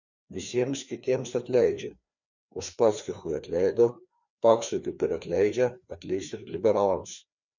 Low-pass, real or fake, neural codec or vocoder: 7.2 kHz; fake; codec, 16 kHz, 2 kbps, FreqCodec, larger model